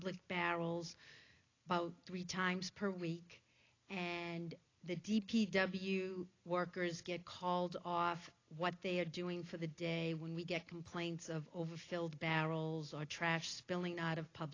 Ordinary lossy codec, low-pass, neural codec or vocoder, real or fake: AAC, 32 kbps; 7.2 kHz; none; real